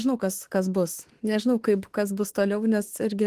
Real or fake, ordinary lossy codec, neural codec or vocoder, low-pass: fake; Opus, 16 kbps; autoencoder, 48 kHz, 32 numbers a frame, DAC-VAE, trained on Japanese speech; 14.4 kHz